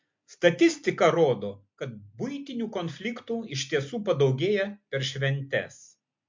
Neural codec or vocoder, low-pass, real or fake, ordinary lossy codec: none; 7.2 kHz; real; MP3, 48 kbps